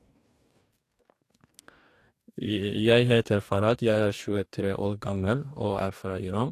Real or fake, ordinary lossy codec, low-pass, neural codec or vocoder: fake; AAC, 64 kbps; 14.4 kHz; codec, 44.1 kHz, 2.6 kbps, DAC